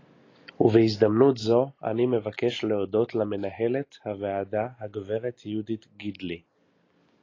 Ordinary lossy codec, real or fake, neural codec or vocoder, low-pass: AAC, 32 kbps; real; none; 7.2 kHz